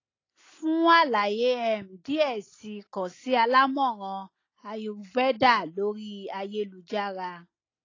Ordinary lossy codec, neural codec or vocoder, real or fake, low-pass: AAC, 32 kbps; none; real; 7.2 kHz